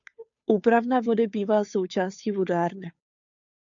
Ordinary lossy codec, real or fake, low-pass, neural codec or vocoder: AAC, 64 kbps; fake; 7.2 kHz; codec, 16 kHz, 2 kbps, FunCodec, trained on Chinese and English, 25 frames a second